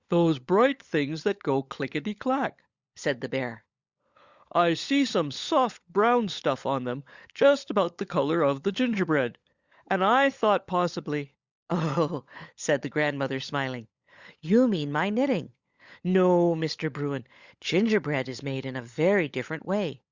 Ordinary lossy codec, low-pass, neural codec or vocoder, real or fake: Opus, 64 kbps; 7.2 kHz; codec, 16 kHz, 16 kbps, FunCodec, trained on LibriTTS, 50 frames a second; fake